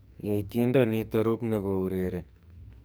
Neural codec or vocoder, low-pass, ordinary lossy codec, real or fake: codec, 44.1 kHz, 2.6 kbps, SNAC; none; none; fake